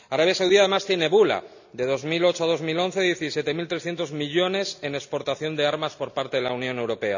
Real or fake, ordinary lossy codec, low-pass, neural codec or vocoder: real; none; 7.2 kHz; none